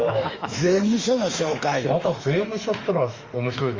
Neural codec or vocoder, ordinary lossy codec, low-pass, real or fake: autoencoder, 48 kHz, 32 numbers a frame, DAC-VAE, trained on Japanese speech; Opus, 32 kbps; 7.2 kHz; fake